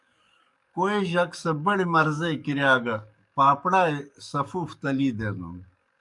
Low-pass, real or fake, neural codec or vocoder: 10.8 kHz; fake; codec, 44.1 kHz, 7.8 kbps, DAC